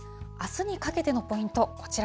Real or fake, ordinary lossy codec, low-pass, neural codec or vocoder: real; none; none; none